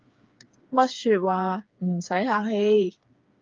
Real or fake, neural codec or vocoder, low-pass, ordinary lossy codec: fake; codec, 16 kHz, 4 kbps, FreqCodec, smaller model; 7.2 kHz; Opus, 32 kbps